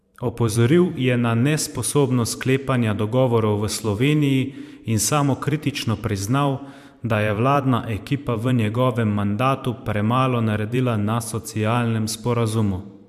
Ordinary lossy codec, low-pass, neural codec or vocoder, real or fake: MP3, 96 kbps; 14.4 kHz; vocoder, 44.1 kHz, 128 mel bands every 512 samples, BigVGAN v2; fake